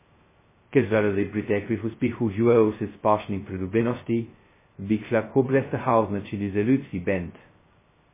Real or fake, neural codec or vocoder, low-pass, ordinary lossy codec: fake; codec, 16 kHz, 0.2 kbps, FocalCodec; 3.6 kHz; MP3, 16 kbps